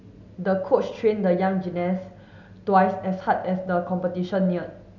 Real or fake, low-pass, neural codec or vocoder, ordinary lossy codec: real; 7.2 kHz; none; none